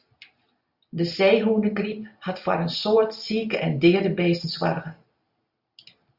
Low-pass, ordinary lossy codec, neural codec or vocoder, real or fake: 5.4 kHz; Opus, 64 kbps; none; real